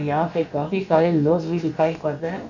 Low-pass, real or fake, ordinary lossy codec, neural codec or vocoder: 7.2 kHz; fake; none; codec, 16 kHz, about 1 kbps, DyCAST, with the encoder's durations